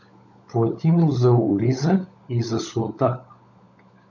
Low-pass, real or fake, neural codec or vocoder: 7.2 kHz; fake; codec, 16 kHz, 16 kbps, FunCodec, trained on LibriTTS, 50 frames a second